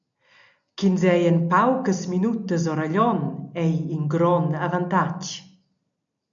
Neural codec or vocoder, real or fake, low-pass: none; real; 7.2 kHz